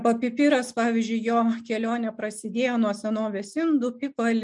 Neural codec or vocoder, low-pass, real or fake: none; 10.8 kHz; real